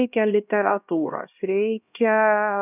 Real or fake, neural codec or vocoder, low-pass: fake; codec, 16 kHz, 1 kbps, X-Codec, HuBERT features, trained on LibriSpeech; 3.6 kHz